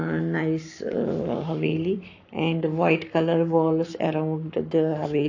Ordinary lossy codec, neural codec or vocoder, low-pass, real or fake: AAC, 48 kbps; codec, 44.1 kHz, 7.8 kbps, Pupu-Codec; 7.2 kHz; fake